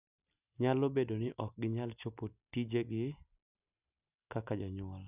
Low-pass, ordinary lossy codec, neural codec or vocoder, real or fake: 3.6 kHz; none; none; real